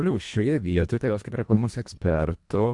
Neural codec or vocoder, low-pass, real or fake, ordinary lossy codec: codec, 24 kHz, 1.5 kbps, HILCodec; 10.8 kHz; fake; MP3, 64 kbps